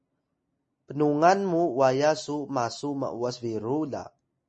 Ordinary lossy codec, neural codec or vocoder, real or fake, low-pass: MP3, 32 kbps; none; real; 10.8 kHz